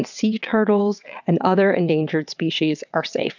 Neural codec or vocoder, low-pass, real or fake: vocoder, 22.05 kHz, 80 mel bands, WaveNeXt; 7.2 kHz; fake